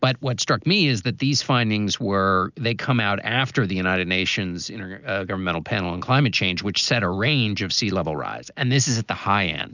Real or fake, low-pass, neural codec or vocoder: real; 7.2 kHz; none